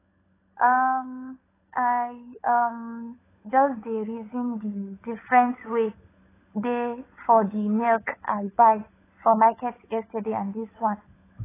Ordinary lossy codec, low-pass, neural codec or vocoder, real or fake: AAC, 16 kbps; 3.6 kHz; codec, 16 kHz, 16 kbps, FunCodec, trained on LibriTTS, 50 frames a second; fake